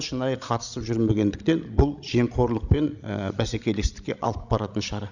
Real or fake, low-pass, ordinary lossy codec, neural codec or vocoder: fake; 7.2 kHz; none; codec, 16 kHz, 16 kbps, FreqCodec, larger model